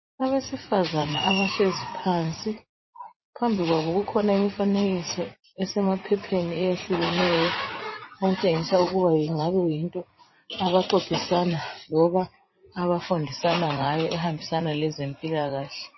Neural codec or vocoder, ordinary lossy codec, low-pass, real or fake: vocoder, 44.1 kHz, 80 mel bands, Vocos; MP3, 24 kbps; 7.2 kHz; fake